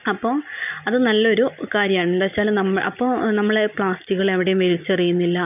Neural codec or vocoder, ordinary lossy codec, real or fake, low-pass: none; none; real; 3.6 kHz